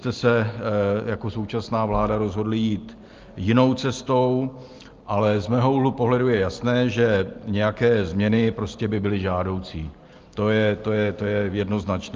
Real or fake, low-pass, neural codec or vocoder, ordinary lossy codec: real; 7.2 kHz; none; Opus, 24 kbps